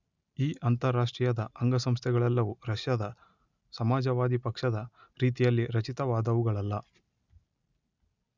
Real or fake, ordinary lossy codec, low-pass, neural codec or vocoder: real; none; 7.2 kHz; none